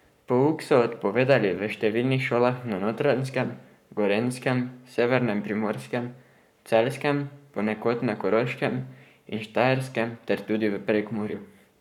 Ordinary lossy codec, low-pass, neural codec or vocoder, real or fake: none; 19.8 kHz; codec, 44.1 kHz, 7.8 kbps, Pupu-Codec; fake